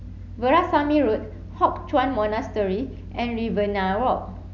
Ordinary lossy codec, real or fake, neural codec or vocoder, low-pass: none; real; none; 7.2 kHz